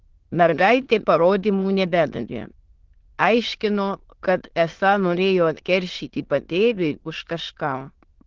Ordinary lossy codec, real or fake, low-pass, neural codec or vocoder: Opus, 16 kbps; fake; 7.2 kHz; autoencoder, 22.05 kHz, a latent of 192 numbers a frame, VITS, trained on many speakers